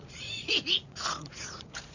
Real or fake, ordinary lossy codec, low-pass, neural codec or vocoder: fake; none; 7.2 kHz; vocoder, 44.1 kHz, 80 mel bands, Vocos